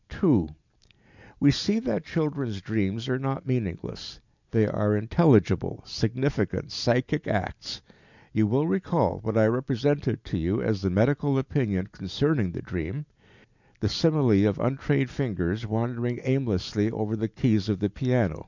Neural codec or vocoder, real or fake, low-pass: none; real; 7.2 kHz